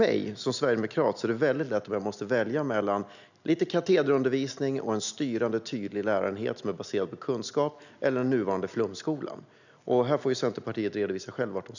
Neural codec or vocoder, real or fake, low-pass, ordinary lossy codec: none; real; 7.2 kHz; none